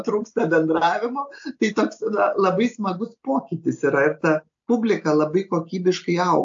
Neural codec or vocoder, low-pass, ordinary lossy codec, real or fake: none; 7.2 kHz; AAC, 64 kbps; real